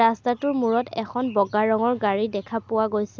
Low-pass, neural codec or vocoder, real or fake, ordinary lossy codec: none; none; real; none